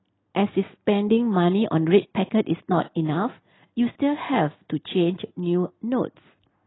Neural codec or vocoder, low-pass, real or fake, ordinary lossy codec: none; 7.2 kHz; real; AAC, 16 kbps